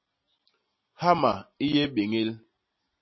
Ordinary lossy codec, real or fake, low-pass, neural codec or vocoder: MP3, 24 kbps; real; 7.2 kHz; none